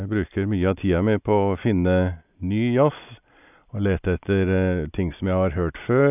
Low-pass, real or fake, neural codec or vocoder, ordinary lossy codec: 3.6 kHz; real; none; none